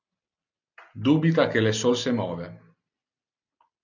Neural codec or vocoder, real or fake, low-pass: none; real; 7.2 kHz